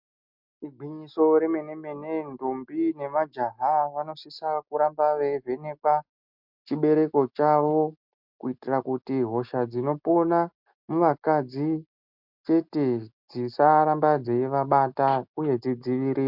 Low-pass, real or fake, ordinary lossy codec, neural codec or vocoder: 5.4 kHz; real; MP3, 48 kbps; none